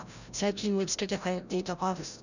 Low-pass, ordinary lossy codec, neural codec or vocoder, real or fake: 7.2 kHz; none; codec, 16 kHz, 0.5 kbps, FreqCodec, larger model; fake